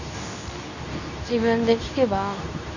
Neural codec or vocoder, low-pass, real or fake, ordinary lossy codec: codec, 24 kHz, 0.9 kbps, WavTokenizer, medium speech release version 2; 7.2 kHz; fake; none